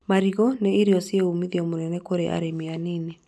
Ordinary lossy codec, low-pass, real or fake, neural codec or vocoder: none; none; real; none